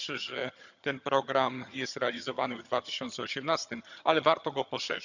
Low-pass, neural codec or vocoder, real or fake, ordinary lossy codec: 7.2 kHz; vocoder, 22.05 kHz, 80 mel bands, HiFi-GAN; fake; none